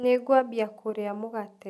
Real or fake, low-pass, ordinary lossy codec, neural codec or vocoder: real; none; none; none